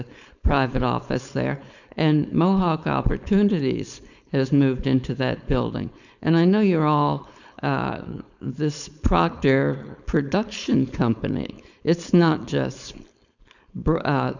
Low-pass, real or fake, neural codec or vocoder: 7.2 kHz; fake; codec, 16 kHz, 4.8 kbps, FACodec